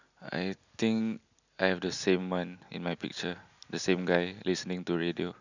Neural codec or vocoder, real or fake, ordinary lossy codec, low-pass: none; real; none; 7.2 kHz